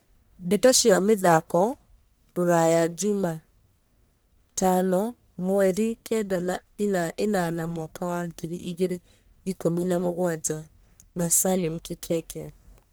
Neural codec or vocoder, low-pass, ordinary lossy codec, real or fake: codec, 44.1 kHz, 1.7 kbps, Pupu-Codec; none; none; fake